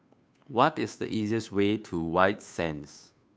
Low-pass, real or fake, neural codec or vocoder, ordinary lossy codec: none; fake; codec, 16 kHz, 2 kbps, FunCodec, trained on Chinese and English, 25 frames a second; none